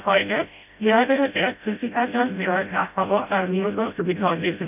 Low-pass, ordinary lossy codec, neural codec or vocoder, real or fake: 3.6 kHz; none; codec, 16 kHz, 0.5 kbps, FreqCodec, smaller model; fake